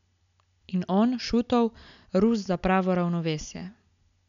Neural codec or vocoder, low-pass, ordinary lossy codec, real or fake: none; 7.2 kHz; none; real